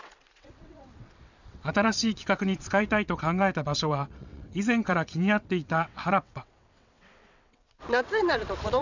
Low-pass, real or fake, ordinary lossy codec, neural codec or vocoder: 7.2 kHz; fake; none; vocoder, 22.05 kHz, 80 mel bands, WaveNeXt